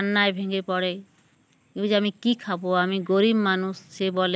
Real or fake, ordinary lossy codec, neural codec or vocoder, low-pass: real; none; none; none